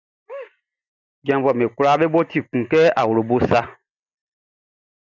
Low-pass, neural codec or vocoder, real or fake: 7.2 kHz; none; real